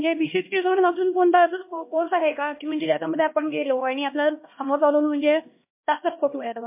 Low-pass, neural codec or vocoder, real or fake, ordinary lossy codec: 3.6 kHz; codec, 16 kHz, 1 kbps, X-Codec, HuBERT features, trained on LibriSpeech; fake; MP3, 24 kbps